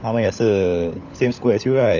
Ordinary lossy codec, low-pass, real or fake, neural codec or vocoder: none; 7.2 kHz; fake; codec, 16 kHz, 16 kbps, FunCodec, trained on LibriTTS, 50 frames a second